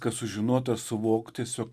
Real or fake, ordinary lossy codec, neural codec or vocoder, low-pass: real; Opus, 64 kbps; none; 14.4 kHz